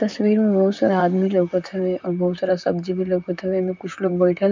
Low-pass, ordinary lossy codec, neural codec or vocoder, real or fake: 7.2 kHz; MP3, 64 kbps; vocoder, 44.1 kHz, 128 mel bands, Pupu-Vocoder; fake